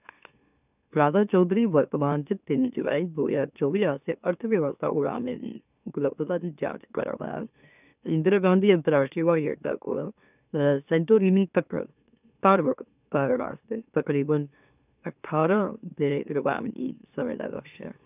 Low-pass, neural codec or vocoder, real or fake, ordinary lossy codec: 3.6 kHz; autoencoder, 44.1 kHz, a latent of 192 numbers a frame, MeloTTS; fake; none